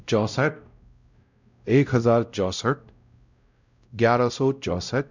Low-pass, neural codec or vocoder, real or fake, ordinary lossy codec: 7.2 kHz; codec, 16 kHz, 0.5 kbps, X-Codec, WavLM features, trained on Multilingual LibriSpeech; fake; none